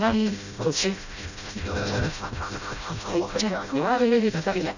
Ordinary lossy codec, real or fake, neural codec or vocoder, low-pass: none; fake; codec, 16 kHz, 0.5 kbps, FreqCodec, smaller model; 7.2 kHz